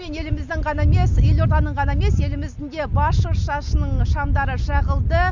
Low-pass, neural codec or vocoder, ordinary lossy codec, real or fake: 7.2 kHz; none; none; real